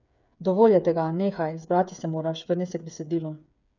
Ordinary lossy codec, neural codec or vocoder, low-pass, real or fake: none; codec, 16 kHz, 8 kbps, FreqCodec, smaller model; 7.2 kHz; fake